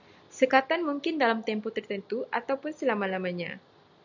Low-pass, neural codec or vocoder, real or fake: 7.2 kHz; none; real